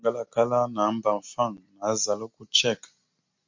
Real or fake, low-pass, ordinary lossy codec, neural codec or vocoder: real; 7.2 kHz; MP3, 48 kbps; none